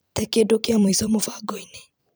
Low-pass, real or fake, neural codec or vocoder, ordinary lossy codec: none; fake; vocoder, 44.1 kHz, 128 mel bands every 256 samples, BigVGAN v2; none